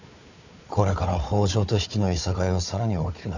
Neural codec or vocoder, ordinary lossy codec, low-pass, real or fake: codec, 16 kHz, 4 kbps, FunCodec, trained on Chinese and English, 50 frames a second; none; 7.2 kHz; fake